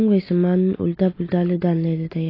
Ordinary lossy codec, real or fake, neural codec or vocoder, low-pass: AAC, 24 kbps; real; none; 5.4 kHz